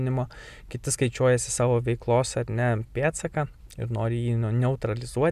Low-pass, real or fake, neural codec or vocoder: 14.4 kHz; real; none